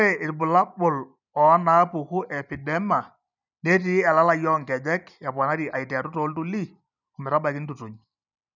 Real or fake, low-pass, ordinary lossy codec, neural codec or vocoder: real; 7.2 kHz; none; none